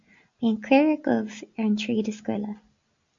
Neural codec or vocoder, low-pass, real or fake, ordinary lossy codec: none; 7.2 kHz; real; MP3, 64 kbps